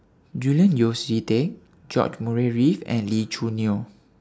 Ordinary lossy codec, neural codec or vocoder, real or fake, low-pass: none; none; real; none